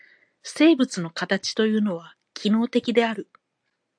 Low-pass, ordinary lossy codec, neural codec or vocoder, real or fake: 9.9 kHz; AAC, 64 kbps; none; real